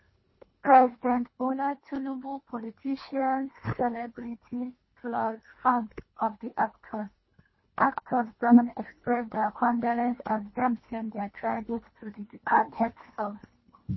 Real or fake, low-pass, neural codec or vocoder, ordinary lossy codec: fake; 7.2 kHz; codec, 24 kHz, 1.5 kbps, HILCodec; MP3, 24 kbps